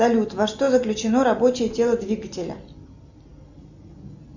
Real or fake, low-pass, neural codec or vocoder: real; 7.2 kHz; none